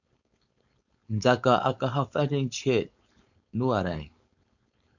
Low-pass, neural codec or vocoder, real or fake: 7.2 kHz; codec, 16 kHz, 4.8 kbps, FACodec; fake